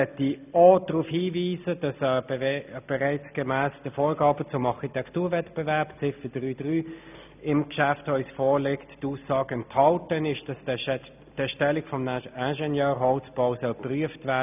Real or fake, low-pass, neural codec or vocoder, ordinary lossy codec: real; 3.6 kHz; none; none